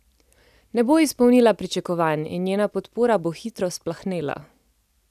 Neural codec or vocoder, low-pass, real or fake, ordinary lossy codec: none; 14.4 kHz; real; none